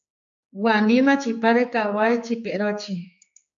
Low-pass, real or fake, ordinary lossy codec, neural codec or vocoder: 7.2 kHz; fake; AAC, 64 kbps; codec, 16 kHz, 4 kbps, X-Codec, HuBERT features, trained on general audio